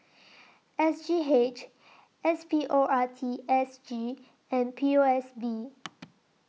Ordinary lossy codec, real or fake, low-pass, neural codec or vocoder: none; real; none; none